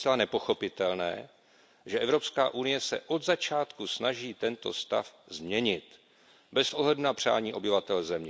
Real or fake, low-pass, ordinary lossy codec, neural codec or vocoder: real; none; none; none